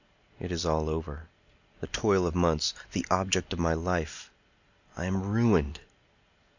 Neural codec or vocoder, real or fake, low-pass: none; real; 7.2 kHz